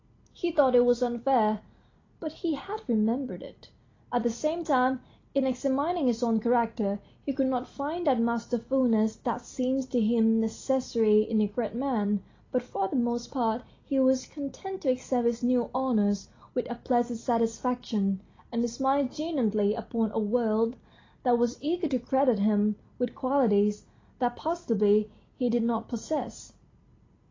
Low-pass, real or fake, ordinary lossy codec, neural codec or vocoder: 7.2 kHz; real; AAC, 32 kbps; none